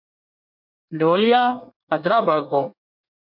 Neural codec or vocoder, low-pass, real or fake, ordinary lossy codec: codec, 24 kHz, 1 kbps, SNAC; 5.4 kHz; fake; MP3, 48 kbps